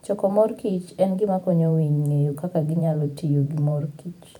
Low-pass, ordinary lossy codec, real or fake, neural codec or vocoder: 19.8 kHz; none; fake; vocoder, 44.1 kHz, 128 mel bands every 512 samples, BigVGAN v2